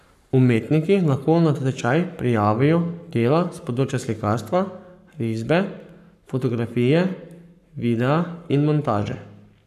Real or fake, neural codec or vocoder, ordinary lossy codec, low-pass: fake; codec, 44.1 kHz, 7.8 kbps, Pupu-Codec; none; 14.4 kHz